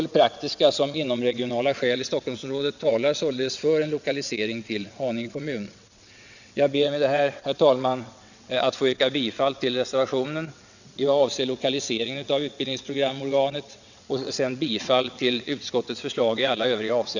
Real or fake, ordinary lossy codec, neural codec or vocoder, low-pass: fake; none; vocoder, 22.05 kHz, 80 mel bands, WaveNeXt; 7.2 kHz